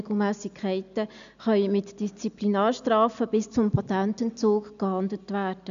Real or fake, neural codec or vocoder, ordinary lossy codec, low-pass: real; none; none; 7.2 kHz